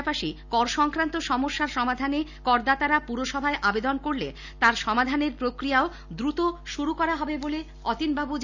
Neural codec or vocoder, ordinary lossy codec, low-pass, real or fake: none; none; 7.2 kHz; real